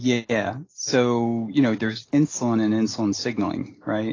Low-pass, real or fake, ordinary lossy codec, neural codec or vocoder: 7.2 kHz; real; AAC, 32 kbps; none